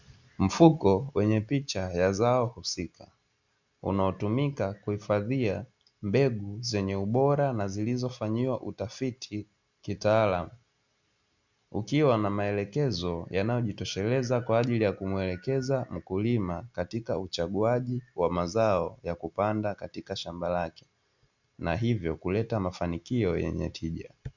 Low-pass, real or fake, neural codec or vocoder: 7.2 kHz; real; none